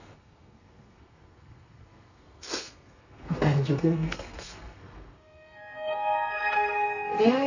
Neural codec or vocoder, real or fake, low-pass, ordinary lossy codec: codec, 32 kHz, 1.9 kbps, SNAC; fake; 7.2 kHz; none